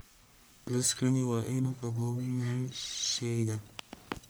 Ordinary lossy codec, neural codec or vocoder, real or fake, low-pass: none; codec, 44.1 kHz, 1.7 kbps, Pupu-Codec; fake; none